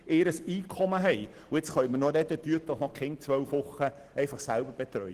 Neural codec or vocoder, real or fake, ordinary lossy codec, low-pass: none; real; Opus, 16 kbps; 14.4 kHz